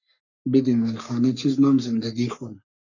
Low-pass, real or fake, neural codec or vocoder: 7.2 kHz; fake; codec, 44.1 kHz, 3.4 kbps, Pupu-Codec